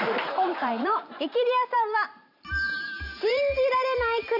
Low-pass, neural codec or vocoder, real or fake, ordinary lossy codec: 5.4 kHz; vocoder, 44.1 kHz, 128 mel bands every 512 samples, BigVGAN v2; fake; none